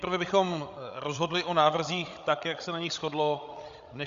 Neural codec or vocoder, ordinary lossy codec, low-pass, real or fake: codec, 16 kHz, 16 kbps, FreqCodec, larger model; Opus, 64 kbps; 7.2 kHz; fake